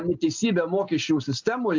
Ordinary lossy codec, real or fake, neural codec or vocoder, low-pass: MP3, 64 kbps; real; none; 7.2 kHz